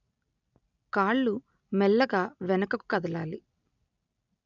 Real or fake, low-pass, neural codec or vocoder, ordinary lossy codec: real; 7.2 kHz; none; none